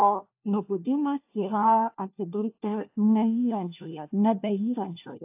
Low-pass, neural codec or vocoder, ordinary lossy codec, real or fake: 3.6 kHz; codec, 16 kHz, 1 kbps, FunCodec, trained on LibriTTS, 50 frames a second; AAC, 32 kbps; fake